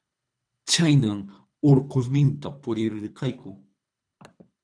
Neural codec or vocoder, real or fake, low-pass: codec, 24 kHz, 3 kbps, HILCodec; fake; 9.9 kHz